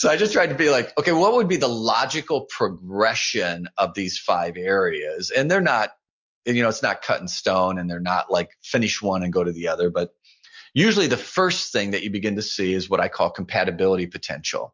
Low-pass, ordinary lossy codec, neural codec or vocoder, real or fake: 7.2 kHz; MP3, 64 kbps; none; real